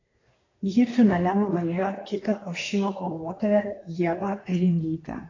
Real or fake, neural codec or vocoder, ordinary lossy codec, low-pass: fake; codec, 24 kHz, 1 kbps, SNAC; AAC, 32 kbps; 7.2 kHz